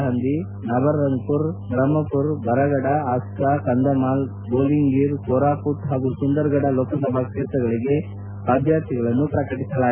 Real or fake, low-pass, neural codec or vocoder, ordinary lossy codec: real; 3.6 kHz; none; none